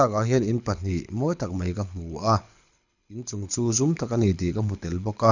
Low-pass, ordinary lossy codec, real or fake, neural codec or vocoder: 7.2 kHz; none; fake; codec, 24 kHz, 6 kbps, HILCodec